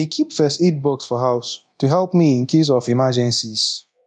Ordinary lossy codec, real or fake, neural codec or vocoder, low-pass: none; fake; codec, 24 kHz, 0.9 kbps, DualCodec; 10.8 kHz